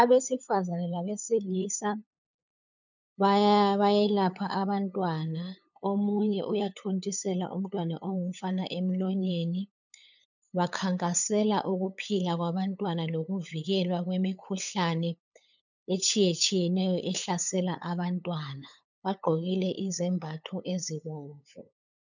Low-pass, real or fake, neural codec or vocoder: 7.2 kHz; fake; codec, 16 kHz, 8 kbps, FunCodec, trained on LibriTTS, 25 frames a second